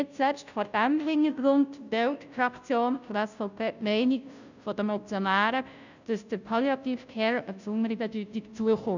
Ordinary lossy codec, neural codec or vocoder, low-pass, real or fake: none; codec, 16 kHz, 0.5 kbps, FunCodec, trained on Chinese and English, 25 frames a second; 7.2 kHz; fake